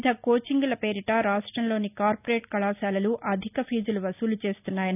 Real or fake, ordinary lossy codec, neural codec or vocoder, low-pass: real; none; none; 3.6 kHz